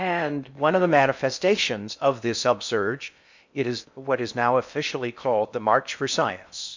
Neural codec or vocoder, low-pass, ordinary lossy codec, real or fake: codec, 16 kHz in and 24 kHz out, 0.6 kbps, FocalCodec, streaming, 4096 codes; 7.2 kHz; MP3, 64 kbps; fake